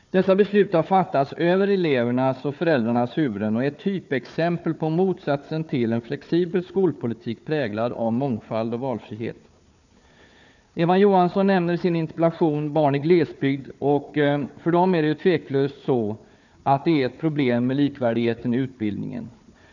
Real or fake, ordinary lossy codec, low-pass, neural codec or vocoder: fake; none; 7.2 kHz; codec, 16 kHz, 4 kbps, FunCodec, trained on Chinese and English, 50 frames a second